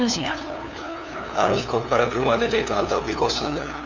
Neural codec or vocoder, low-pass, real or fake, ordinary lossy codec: codec, 16 kHz, 2 kbps, FunCodec, trained on LibriTTS, 25 frames a second; 7.2 kHz; fake; none